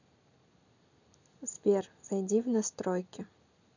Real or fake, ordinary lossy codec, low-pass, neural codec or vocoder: real; none; 7.2 kHz; none